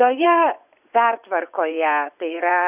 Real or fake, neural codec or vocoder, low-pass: fake; vocoder, 24 kHz, 100 mel bands, Vocos; 3.6 kHz